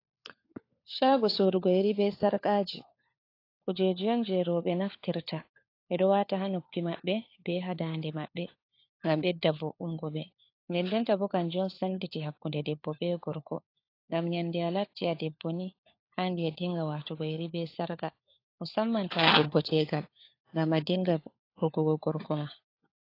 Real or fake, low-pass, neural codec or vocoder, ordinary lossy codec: fake; 5.4 kHz; codec, 16 kHz, 4 kbps, FunCodec, trained on LibriTTS, 50 frames a second; AAC, 32 kbps